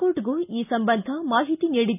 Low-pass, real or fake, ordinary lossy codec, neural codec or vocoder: 3.6 kHz; real; none; none